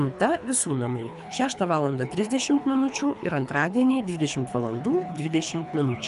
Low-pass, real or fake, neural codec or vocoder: 10.8 kHz; fake; codec, 24 kHz, 3 kbps, HILCodec